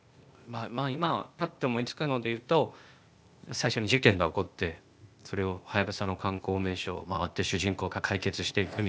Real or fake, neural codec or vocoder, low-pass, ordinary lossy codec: fake; codec, 16 kHz, 0.8 kbps, ZipCodec; none; none